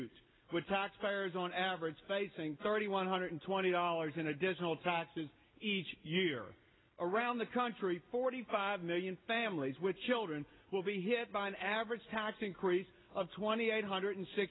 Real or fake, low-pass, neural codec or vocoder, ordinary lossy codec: real; 7.2 kHz; none; AAC, 16 kbps